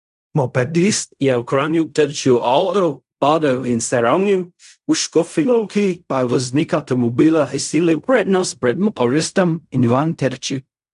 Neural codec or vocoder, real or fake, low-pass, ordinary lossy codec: codec, 16 kHz in and 24 kHz out, 0.4 kbps, LongCat-Audio-Codec, fine tuned four codebook decoder; fake; 10.8 kHz; none